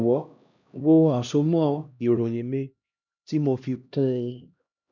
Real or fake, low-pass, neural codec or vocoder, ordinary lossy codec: fake; 7.2 kHz; codec, 16 kHz, 1 kbps, X-Codec, HuBERT features, trained on LibriSpeech; none